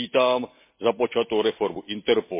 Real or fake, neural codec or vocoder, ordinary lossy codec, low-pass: real; none; MP3, 24 kbps; 3.6 kHz